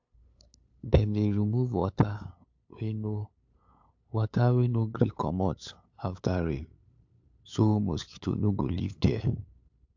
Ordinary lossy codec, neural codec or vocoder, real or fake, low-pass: AAC, 48 kbps; codec, 16 kHz, 8 kbps, FunCodec, trained on LibriTTS, 25 frames a second; fake; 7.2 kHz